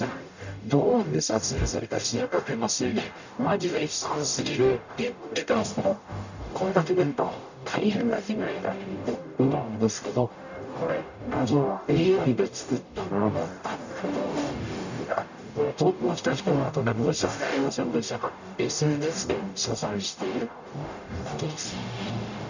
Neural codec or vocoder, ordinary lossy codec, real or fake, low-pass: codec, 44.1 kHz, 0.9 kbps, DAC; none; fake; 7.2 kHz